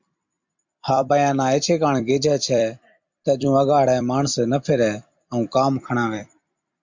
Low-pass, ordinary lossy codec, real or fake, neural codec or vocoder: 7.2 kHz; MP3, 64 kbps; fake; vocoder, 44.1 kHz, 128 mel bands every 256 samples, BigVGAN v2